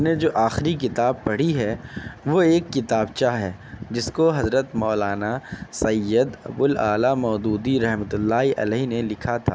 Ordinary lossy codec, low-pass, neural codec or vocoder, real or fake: none; none; none; real